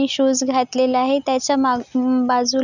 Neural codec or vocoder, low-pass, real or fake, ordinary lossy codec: none; 7.2 kHz; real; none